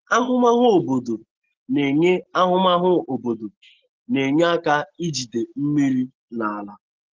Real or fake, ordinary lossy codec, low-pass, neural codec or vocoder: real; Opus, 16 kbps; 7.2 kHz; none